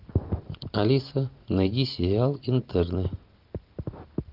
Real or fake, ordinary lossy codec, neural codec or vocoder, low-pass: real; Opus, 32 kbps; none; 5.4 kHz